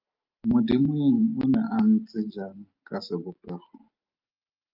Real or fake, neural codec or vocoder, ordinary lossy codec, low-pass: real; none; Opus, 32 kbps; 5.4 kHz